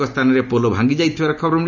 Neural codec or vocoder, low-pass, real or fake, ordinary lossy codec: none; 7.2 kHz; real; none